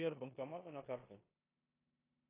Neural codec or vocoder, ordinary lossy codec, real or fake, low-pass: codec, 16 kHz in and 24 kHz out, 0.9 kbps, LongCat-Audio-Codec, four codebook decoder; AAC, 16 kbps; fake; 3.6 kHz